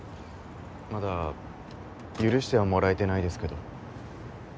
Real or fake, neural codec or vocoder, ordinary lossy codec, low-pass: real; none; none; none